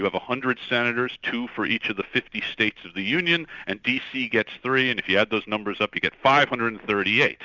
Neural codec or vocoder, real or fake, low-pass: none; real; 7.2 kHz